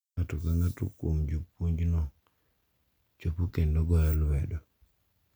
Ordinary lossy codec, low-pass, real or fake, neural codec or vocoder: none; none; real; none